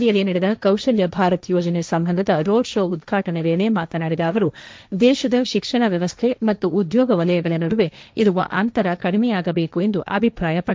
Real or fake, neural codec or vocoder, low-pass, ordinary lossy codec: fake; codec, 16 kHz, 1.1 kbps, Voila-Tokenizer; none; none